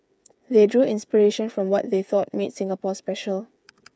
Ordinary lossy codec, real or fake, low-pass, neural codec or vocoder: none; fake; none; codec, 16 kHz, 8 kbps, FreqCodec, smaller model